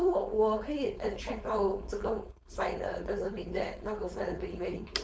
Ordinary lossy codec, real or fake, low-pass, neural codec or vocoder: none; fake; none; codec, 16 kHz, 4.8 kbps, FACodec